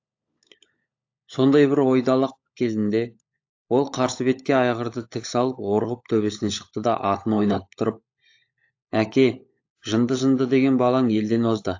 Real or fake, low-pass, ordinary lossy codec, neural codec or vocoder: fake; 7.2 kHz; AAC, 48 kbps; codec, 16 kHz, 16 kbps, FunCodec, trained on LibriTTS, 50 frames a second